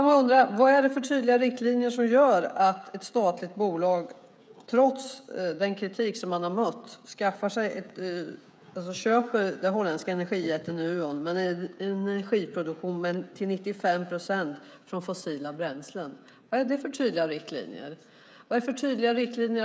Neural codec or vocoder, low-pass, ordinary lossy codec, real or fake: codec, 16 kHz, 16 kbps, FreqCodec, smaller model; none; none; fake